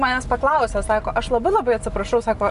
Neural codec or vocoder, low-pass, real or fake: none; 10.8 kHz; real